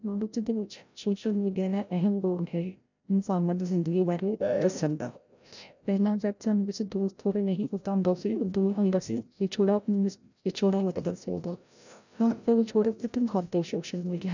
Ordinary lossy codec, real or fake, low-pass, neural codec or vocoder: none; fake; 7.2 kHz; codec, 16 kHz, 0.5 kbps, FreqCodec, larger model